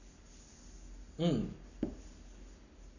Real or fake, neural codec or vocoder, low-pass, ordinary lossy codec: fake; codec, 44.1 kHz, 7.8 kbps, Pupu-Codec; 7.2 kHz; none